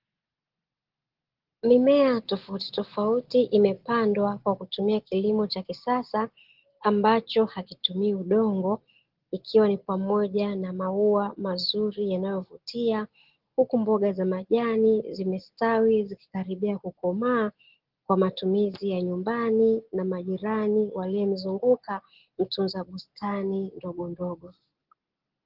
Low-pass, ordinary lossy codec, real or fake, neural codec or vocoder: 5.4 kHz; Opus, 16 kbps; real; none